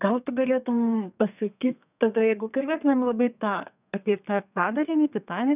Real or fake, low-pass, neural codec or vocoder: fake; 3.6 kHz; codec, 32 kHz, 1.9 kbps, SNAC